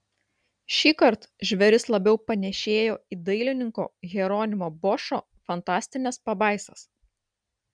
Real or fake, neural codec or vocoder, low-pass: real; none; 9.9 kHz